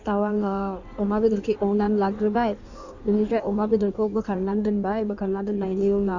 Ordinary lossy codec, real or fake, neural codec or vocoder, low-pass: none; fake; codec, 16 kHz in and 24 kHz out, 1.1 kbps, FireRedTTS-2 codec; 7.2 kHz